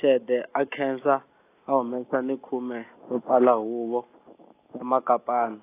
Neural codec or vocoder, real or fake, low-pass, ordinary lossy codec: none; real; 3.6 kHz; AAC, 24 kbps